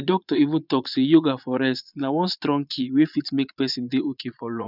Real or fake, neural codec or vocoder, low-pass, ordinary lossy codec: fake; vocoder, 22.05 kHz, 80 mel bands, Vocos; 5.4 kHz; none